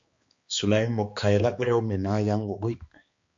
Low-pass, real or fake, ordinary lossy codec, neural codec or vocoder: 7.2 kHz; fake; MP3, 48 kbps; codec, 16 kHz, 2 kbps, X-Codec, HuBERT features, trained on balanced general audio